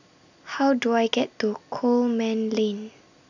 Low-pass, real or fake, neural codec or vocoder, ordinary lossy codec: 7.2 kHz; real; none; none